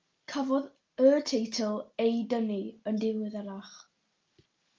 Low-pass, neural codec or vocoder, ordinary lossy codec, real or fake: 7.2 kHz; none; Opus, 32 kbps; real